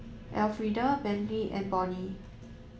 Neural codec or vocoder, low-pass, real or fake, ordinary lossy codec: none; none; real; none